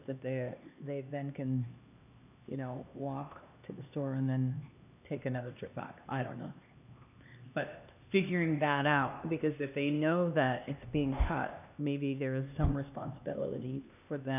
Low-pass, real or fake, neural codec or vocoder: 3.6 kHz; fake; codec, 16 kHz, 2 kbps, X-Codec, HuBERT features, trained on LibriSpeech